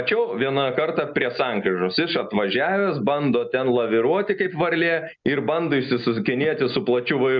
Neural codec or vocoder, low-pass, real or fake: none; 7.2 kHz; real